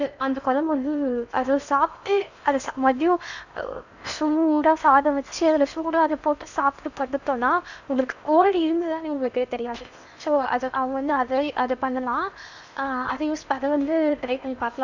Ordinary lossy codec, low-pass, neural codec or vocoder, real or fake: none; 7.2 kHz; codec, 16 kHz in and 24 kHz out, 0.8 kbps, FocalCodec, streaming, 65536 codes; fake